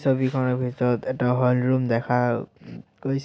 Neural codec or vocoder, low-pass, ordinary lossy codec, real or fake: none; none; none; real